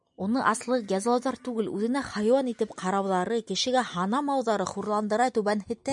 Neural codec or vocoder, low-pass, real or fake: none; 10.8 kHz; real